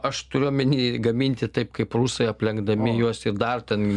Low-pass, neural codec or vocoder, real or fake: 10.8 kHz; none; real